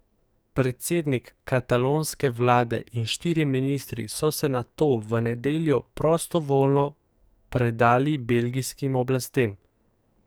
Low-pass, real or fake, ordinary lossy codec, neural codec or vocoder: none; fake; none; codec, 44.1 kHz, 2.6 kbps, SNAC